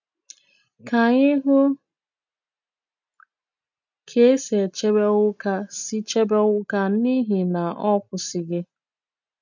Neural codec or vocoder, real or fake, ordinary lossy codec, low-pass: none; real; none; 7.2 kHz